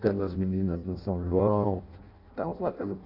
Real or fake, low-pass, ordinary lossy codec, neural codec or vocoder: fake; 5.4 kHz; none; codec, 16 kHz in and 24 kHz out, 0.6 kbps, FireRedTTS-2 codec